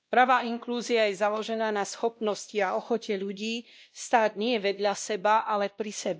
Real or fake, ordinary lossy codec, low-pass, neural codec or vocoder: fake; none; none; codec, 16 kHz, 1 kbps, X-Codec, WavLM features, trained on Multilingual LibriSpeech